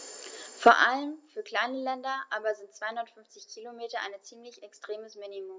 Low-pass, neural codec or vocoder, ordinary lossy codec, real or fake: none; none; none; real